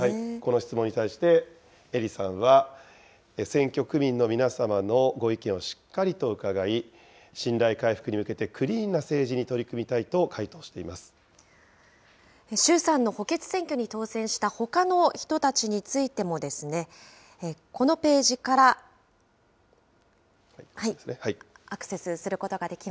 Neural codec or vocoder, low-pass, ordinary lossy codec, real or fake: none; none; none; real